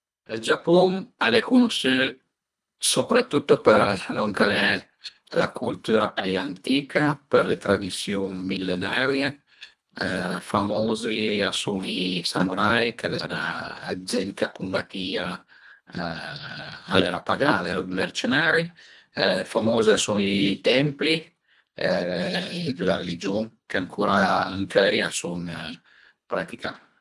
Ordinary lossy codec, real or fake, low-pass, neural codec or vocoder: none; fake; none; codec, 24 kHz, 1.5 kbps, HILCodec